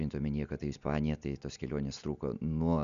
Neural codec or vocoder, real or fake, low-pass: none; real; 7.2 kHz